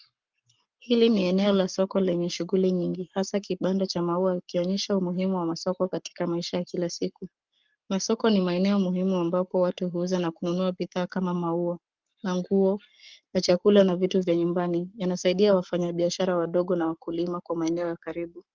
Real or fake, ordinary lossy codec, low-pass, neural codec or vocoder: fake; Opus, 24 kbps; 7.2 kHz; codec, 44.1 kHz, 7.8 kbps, Pupu-Codec